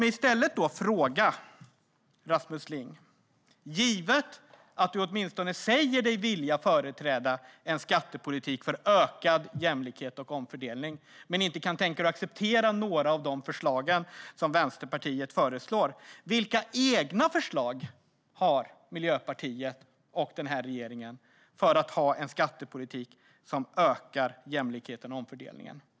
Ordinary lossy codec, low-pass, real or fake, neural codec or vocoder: none; none; real; none